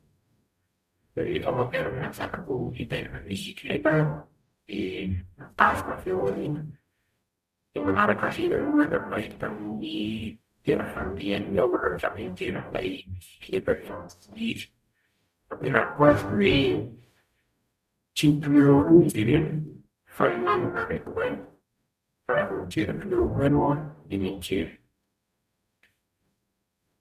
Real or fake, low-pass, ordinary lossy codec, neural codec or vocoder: fake; 14.4 kHz; none; codec, 44.1 kHz, 0.9 kbps, DAC